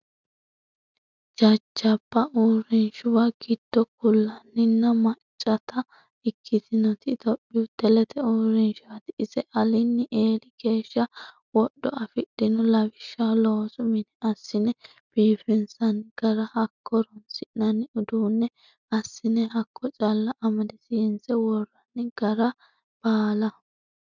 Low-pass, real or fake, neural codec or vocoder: 7.2 kHz; real; none